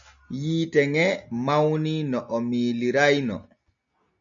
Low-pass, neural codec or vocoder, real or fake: 7.2 kHz; none; real